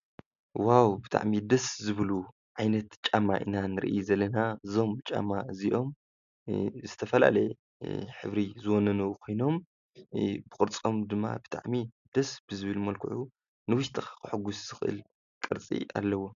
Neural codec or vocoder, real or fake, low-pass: none; real; 7.2 kHz